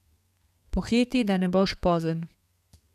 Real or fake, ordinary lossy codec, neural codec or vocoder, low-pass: fake; none; codec, 32 kHz, 1.9 kbps, SNAC; 14.4 kHz